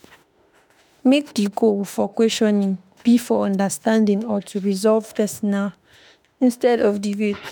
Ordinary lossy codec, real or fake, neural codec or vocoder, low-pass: none; fake; autoencoder, 48 kHz, 32 numbers a frame, DAC-VAE, trained on Japanese speech; none